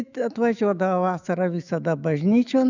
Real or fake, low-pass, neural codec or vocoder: fake; 7.2 kHz; autoencoder, 48 kHz, 128 numbers a frame, DAC-VAE, trained on Japanese speech